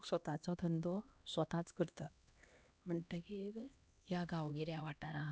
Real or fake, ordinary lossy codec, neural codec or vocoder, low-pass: fake; none; codec, 16 kHz, 1 kbps, X-Codec, HuBERT features, trained on LibriSpeech; none